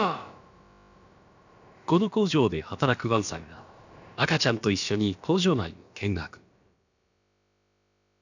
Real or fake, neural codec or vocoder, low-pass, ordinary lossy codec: fake; codec, 16 kHz, about 1 kbps, DyCAST, with the encoder's durations; 7.2 kHz; none